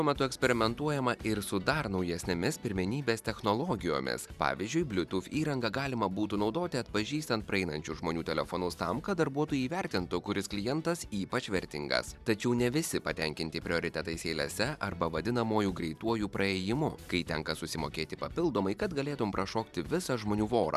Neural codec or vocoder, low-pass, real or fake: vocoder, 44.1 kHz, 128 mel bands every 512 samples, BigVGAN v2; 14.4 kHz; fake